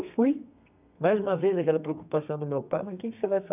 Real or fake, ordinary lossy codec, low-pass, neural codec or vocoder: fake; none; 3.6 kHz; codec, 32 kHz, 1.9 kbps, SNAC